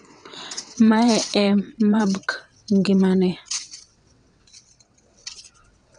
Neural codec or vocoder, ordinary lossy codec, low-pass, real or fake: vocoder, 22.05 kHz, 80 mel bands, WaveNeXt; none; 9.9 kHz; fake